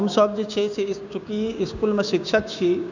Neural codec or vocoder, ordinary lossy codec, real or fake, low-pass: codec, 16 kHz, 6 kbps, DAC; none; fake; 7.2 kHz